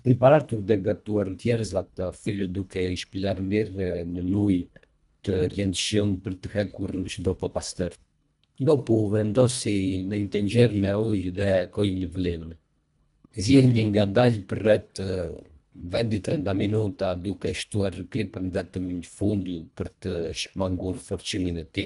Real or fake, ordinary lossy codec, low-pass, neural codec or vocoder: fake; none; 10.8 kHz; codec, 24 kHz, 1.5 kbps, HILCodec